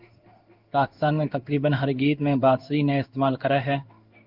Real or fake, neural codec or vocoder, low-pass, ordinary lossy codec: fake; codec, 16 kHz in and 24 kHz out, 1 kbps, XY-Tokenizer; 5.4 kHz; Opus, 32 kbps